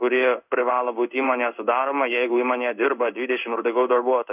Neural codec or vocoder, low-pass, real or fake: codec, 16 kHz in and 24 kHz out, 1 kbps, XY-Tokenizer; 3.6 kHz; fake